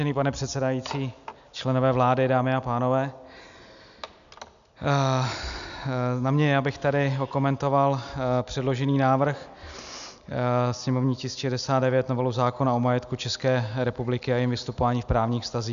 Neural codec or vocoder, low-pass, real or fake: none; 7.2 kHz; real